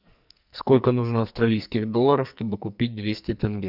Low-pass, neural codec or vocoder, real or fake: 5.4 kHz; codec, 44.1 kHz, 2.6 kbps, SNAC; fake